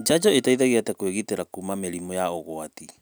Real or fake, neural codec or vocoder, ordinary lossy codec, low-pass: real; none; none; none